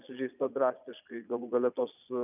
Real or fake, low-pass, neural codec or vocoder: fake; 3.6 kHz; vocoder, 22.05 kHz, 80 mel bands, Vocos